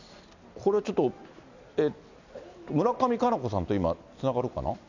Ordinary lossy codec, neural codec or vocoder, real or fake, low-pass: none; none; real; 7.2 kHz